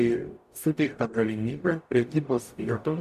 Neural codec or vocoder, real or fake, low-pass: codec, 44.1 kHz, 0.9 kbps, DAC; fake; 14.4 kHz